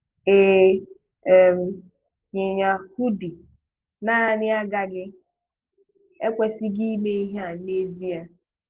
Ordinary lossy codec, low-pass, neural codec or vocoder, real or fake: Opus, 16 kbps; 3.6 kHz; none; real